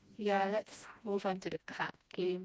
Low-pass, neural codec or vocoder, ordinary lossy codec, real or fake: none; codec, 16 kHz, 1 kbps, FreqCodec, smaller model; none; fake